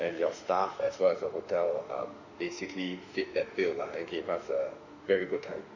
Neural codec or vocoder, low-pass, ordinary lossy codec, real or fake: autoencoder, 48 kHz, 32 numbers a frame, DAC-VAE, trained on Japanese speech; 7.2 kHz; none; fake